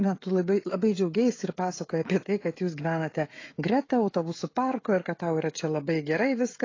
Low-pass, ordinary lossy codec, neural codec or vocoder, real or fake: 7.2 kHz; AAC, 32 kbps; codec, 16 kHz, 16 kbps, FreqCodec, smaller model; fake